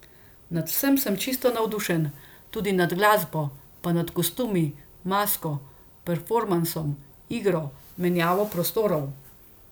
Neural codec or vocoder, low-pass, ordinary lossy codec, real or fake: none; none; none; real